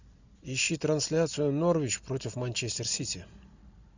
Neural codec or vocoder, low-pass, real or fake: none; 7.2 kHz; real